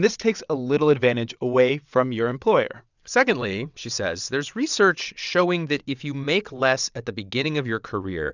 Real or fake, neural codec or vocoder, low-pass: fake; vocoder, 22.05 kHz, 80 mel bands, WaveNeXt; 7.2 kHz